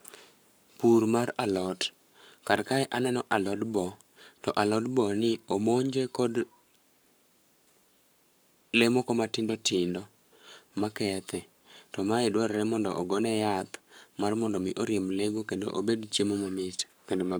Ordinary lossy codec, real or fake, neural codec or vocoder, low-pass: none; fake; codec, 44.1 kHz, 7.8 kbps, Pupu-Codec; none